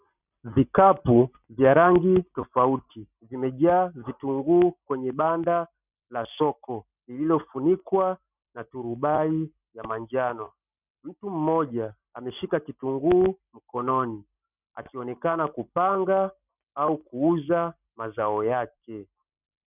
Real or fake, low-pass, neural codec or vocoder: real; 3.6 kHz; none